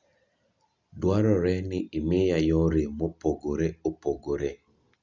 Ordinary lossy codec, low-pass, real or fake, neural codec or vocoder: Opus, 64 kbps; 7.2 kHz; real; none